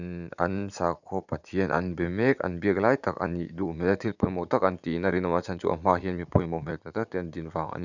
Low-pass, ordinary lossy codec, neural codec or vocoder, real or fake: 7.2 kHz; none; vocoder, 44.1 kHz, 80 mel bands, Vocos; fake